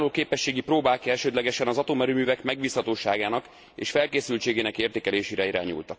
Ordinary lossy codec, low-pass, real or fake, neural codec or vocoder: none; none; real; none